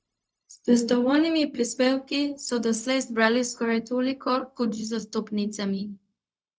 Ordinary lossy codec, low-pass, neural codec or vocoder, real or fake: none; none; codec, 16 kHz, 0.4 kbps, LongCat-Audio-Codec; fake